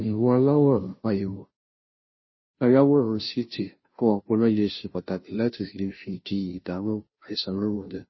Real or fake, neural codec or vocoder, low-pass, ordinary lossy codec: fake; codec, 16 kHz, 0.5 kbps, FunCodec, trained on Chinese and English, 25 frames a second; 7.2 kHz; MP3, 24 kbps